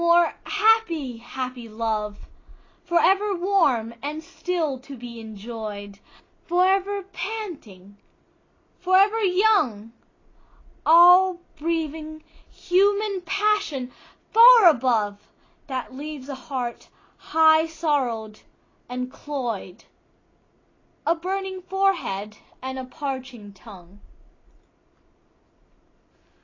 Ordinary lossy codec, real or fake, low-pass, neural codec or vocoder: AAC, 32 kbps; real; 7.2 kHz; none